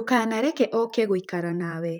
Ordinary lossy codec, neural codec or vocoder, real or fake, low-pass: none; vocoder, 44.1 kHz, 128 mel bands, Pupu-Vocoder; fake; none